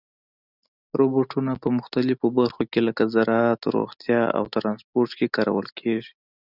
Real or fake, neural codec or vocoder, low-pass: real; none; 5.4 kHz